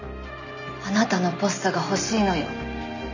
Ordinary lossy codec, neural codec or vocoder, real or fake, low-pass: none; none; real; 7.2 kHz